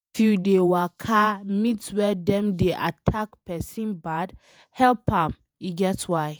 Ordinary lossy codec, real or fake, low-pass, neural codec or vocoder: none; fake; none; vocoder, 48 kHz, 128 mel bands, Vocos